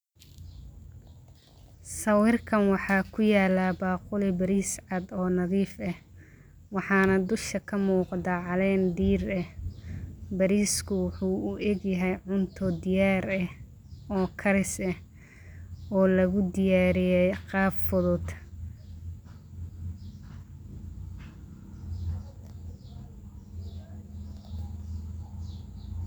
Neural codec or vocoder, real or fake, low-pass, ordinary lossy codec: none; real; none; none